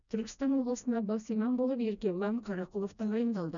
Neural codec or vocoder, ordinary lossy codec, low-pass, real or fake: codec, 16 kHz, 1 kbps, FreqCodec, smaller model; none; 7.2 kHz; fake